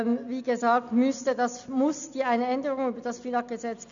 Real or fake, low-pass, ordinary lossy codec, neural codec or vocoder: real; 7.2 kHz; none; none